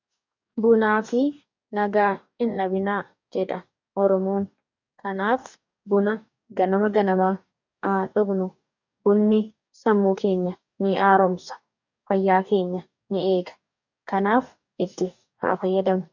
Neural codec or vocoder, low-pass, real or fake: codec, 44.1 kHz, 2.6 kbps, DAC; 7.2 kHz; fake